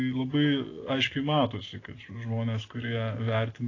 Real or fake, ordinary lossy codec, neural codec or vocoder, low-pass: real; AAC, 32 kbps; none; 7.2 kHz